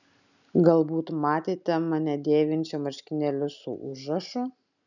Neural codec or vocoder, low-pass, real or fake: none; 7.2 kHz; real